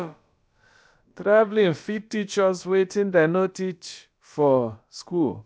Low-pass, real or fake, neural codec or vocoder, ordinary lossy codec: none; fake; codec, 16 kHz, about 1 kbps, DyCAST, with the encoder's durations; none